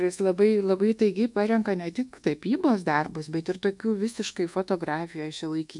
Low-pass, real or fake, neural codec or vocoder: 10.8 kHz; fake; codec, 24 kHz, 1.2 kbps, DualCodec